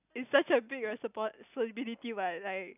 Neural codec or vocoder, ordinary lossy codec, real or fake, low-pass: none; none; real; 3.6 kHz